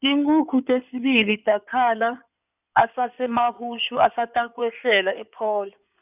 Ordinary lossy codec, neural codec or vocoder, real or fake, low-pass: none; codec, 16 kHz in and 24 kHz out, 2.2 kbps, FireRedTTS-2 codec; fake; 3.6 kHz